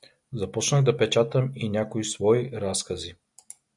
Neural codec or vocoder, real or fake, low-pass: none; real; 10.8 kHz